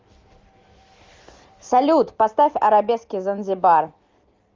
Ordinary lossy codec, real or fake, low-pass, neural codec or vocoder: Opus, 32 kbps; real; 7.2 kHz; none